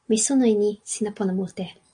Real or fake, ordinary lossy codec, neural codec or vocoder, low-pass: real; AAC, 48 kbps; none; 9.9 kHz